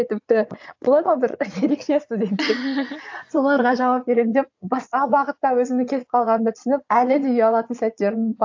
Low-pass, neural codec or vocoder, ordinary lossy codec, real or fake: 7.2 kHz; vocoder, 22.05 kHz, 80 mel bands, Vocos; AAC, 48 kbps; fake